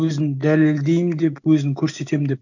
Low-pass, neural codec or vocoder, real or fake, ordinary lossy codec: 7.2 kHz; none; real; none